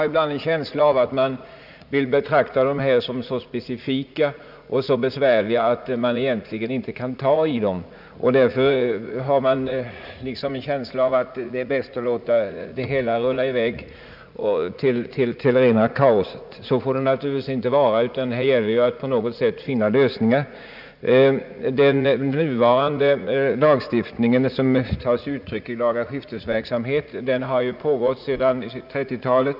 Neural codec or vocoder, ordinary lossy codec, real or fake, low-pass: vocoder, 44.1 kHz, 80 mel bands, Vocos; none; fake; 5.4 kHz